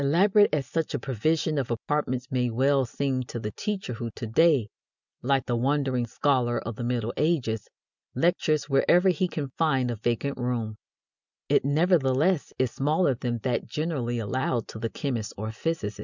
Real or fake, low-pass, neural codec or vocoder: real; 7.2 kHz; none